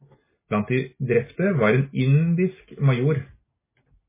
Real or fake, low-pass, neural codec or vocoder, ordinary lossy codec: real; 3.6 kHz; none; MP3, 16 kbps